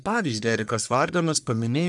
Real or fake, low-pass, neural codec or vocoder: fake; 10.8 kHz; codec, 44.1 kHz, 1.7 kbps, Pupu-Codec